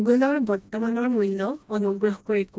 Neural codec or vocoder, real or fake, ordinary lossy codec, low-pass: codec, 16 kHz, 1 kbps, FreqCodec, smaller model; fake; none; none